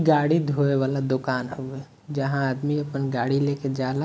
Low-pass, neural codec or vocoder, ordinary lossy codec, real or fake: none; none; none; real